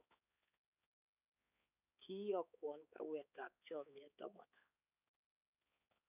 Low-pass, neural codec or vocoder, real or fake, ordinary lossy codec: 3.6 kHz; codec, 16 kHz, 4 kbps, X-Codec, HuBERT features, trained on balanced general audio; fake; none